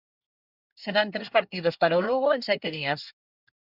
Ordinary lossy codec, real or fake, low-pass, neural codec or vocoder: Opus, 64 kbps; fake; 5.4 kHz; codec, 24 kHz, 1 kbps, SNAC